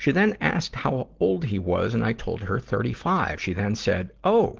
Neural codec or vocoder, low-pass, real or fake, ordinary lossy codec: none; 7.2 kHz; real; Opus, 16 kbps